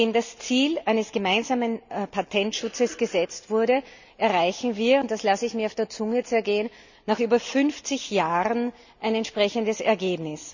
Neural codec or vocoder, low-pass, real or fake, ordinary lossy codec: none; 7.2 kHz; real; none